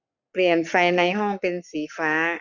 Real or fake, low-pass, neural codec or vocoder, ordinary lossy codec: fake; 7.2 kHz; codec, 44.1 kHz, 7.8 kbps, Pupu-Codec; none